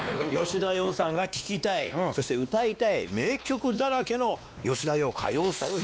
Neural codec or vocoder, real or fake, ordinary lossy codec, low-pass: codec, 16 kHz, 2 kbps, X-Codec, WavLM features, trained on Multilingual LibriSpeech; fake; none; none